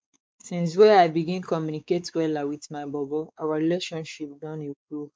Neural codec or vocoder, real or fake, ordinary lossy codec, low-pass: codec, 16 kHz, 4 kbps, X-Codec, WavLM features, trained on Multilingual LibriSpeech; fake; none; none